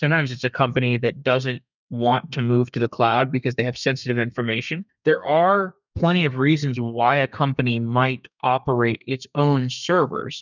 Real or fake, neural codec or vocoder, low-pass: fake; codec, 32 kHz, 1.9 kbps, SNAC; 7.2 kHz